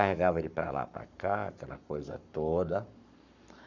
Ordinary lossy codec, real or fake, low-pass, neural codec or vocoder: none; fake; 7.2 kHz; codec, 44.1 kHz, 7.8 kbps, Pupu-Codec